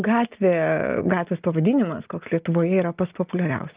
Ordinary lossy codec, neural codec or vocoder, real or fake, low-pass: Opus, 32 kbps; none; real; 3.6 kHz